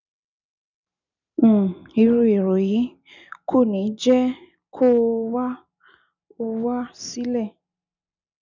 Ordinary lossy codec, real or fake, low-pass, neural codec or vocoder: none; real; 7.2 kHz; none